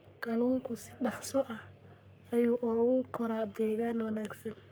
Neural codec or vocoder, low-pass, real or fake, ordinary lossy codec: codec, 44.1 kHz, 3.4 kbps, Pupu-Codec; none; fake; none